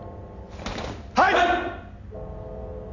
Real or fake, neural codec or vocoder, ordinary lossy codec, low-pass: real; none; none; 7.2 kHz